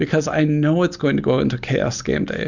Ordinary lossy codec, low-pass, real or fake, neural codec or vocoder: Opus, 64 kbps; 7.2 kHz; real; none